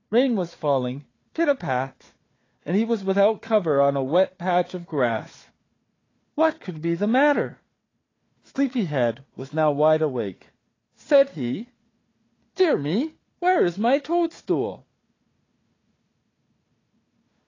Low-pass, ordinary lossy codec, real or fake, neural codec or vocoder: 7.2 kHz; AAC, 32 kbps; fake; codec, 16 kHz, 4 kbps, FunCodec, trained on Chinese and English, 50 frames a second